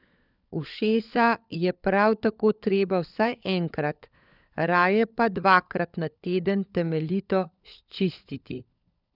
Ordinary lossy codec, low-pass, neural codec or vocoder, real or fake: none; 5.4 kHz; codec, 16 kHz, 16 kbps, FunCodec, trained on LibriTTS, 50 frames a second; fake